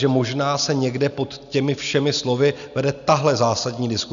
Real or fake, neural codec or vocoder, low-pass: real; none; 7.2 kHz